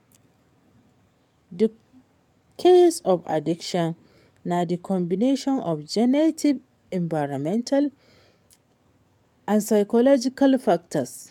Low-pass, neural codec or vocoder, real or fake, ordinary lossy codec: 19.8 kHz; codec, 44.1 kHz, 7.8 kbps, DAC; fake; MP3, 96 kbps